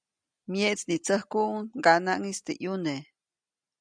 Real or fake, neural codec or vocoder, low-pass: real; none; 9.9 kHz